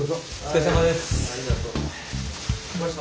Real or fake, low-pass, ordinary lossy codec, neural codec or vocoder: real; none; none; none